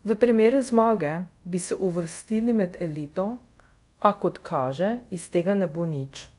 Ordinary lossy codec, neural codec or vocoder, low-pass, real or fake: none; codec, 24 kHz, 0.5 kbps, DualCodec; 10.8 kHz; fake